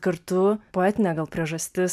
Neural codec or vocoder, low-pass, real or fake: none; 14.4 kHz; real